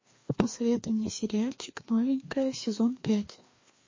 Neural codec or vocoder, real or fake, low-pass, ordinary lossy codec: codec, 16 kHz, 2 kbps, FreqCodec, larger model; fake; 7.2 kHz; MP3, 32 kbps